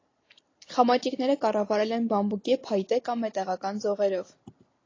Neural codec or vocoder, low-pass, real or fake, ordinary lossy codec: none; 7.2 kHz; real; AAC, 32 kbps